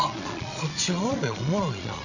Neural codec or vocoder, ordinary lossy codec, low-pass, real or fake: vocoder, 22.05 kHz, 80 mel bands, Vocos; none; 7.2 kHz; fake